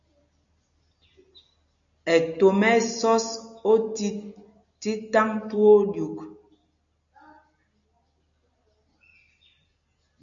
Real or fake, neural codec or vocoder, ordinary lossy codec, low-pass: real; none; MP3, 96 kbps; 7.2 kHz